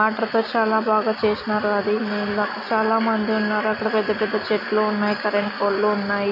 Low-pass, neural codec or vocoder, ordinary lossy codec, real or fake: 5.4 kHz; none; none; real